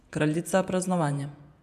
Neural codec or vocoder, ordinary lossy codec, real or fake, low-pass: vocoder, 48 kHz, 128 mel bands, Vocos; none; fake; 14.4 kHz